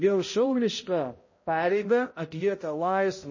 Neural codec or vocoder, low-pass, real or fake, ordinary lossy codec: codec, 16 kHz, 0.5 kbps, X-Codec, HuBERT features, trained on balanced general audio; 7.2 kHz; fake; MP3, 32 kbps